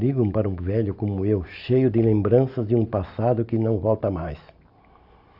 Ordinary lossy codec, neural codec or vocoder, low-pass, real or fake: Opus, 64 kbps; none; 5.4 kHz; real